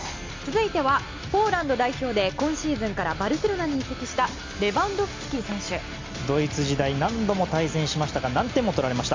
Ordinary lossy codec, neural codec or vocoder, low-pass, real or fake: MP3, 48 kbps; none; 7.2 kHz; real